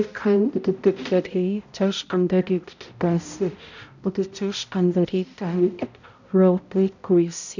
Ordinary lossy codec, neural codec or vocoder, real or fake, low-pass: none; codec, 16 kHz, 0.5 kbps, X-Codec, HuBERT features, trained on balanced general audio; fake; 7.2 kHz